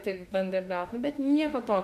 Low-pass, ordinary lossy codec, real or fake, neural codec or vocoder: 14.4 kHz; MP3, 96 kbps; fake; autoencoder, 48 kHz, 32 numbers a frame, DAC-VAE, trained on Japanese speech